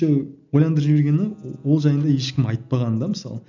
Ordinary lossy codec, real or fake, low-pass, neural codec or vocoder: none; real; 7.2 kHz; none